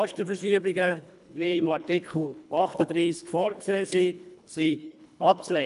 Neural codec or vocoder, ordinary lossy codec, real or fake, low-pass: codec, 24 kHz, 1.5 kbps, HILCodec; none; fake; 10.8 kHz